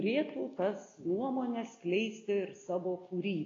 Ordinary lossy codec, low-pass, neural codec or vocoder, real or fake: AAC, 32 kbps; 7.2 kHz; none; real